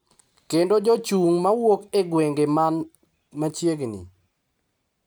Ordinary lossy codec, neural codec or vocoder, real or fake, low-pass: none; none; real; none